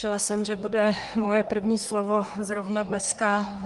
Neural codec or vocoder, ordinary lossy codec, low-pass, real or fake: codec, 24 kHz, 1 kbps, SNAC; Opus, 32 kbps; 10.8 kHz; fake